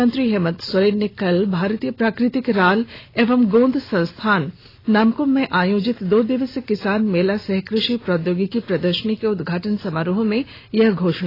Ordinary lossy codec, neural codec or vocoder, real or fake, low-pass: AAC, 24 kbps; none; real; 5.4 kHz